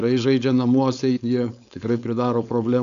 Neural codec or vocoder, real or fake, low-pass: codec, 16 kHz, 4.8 kbps, FACodec; fake; 7.2 kHz